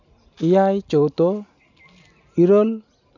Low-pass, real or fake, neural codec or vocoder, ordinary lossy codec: 7.2 kHz; real; none; none